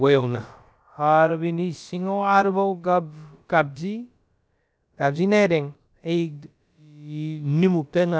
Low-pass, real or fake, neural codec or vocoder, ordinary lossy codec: none; fake; codec, 16 kHz, about 1 kbps, DyCAST, with the encoder's durations; none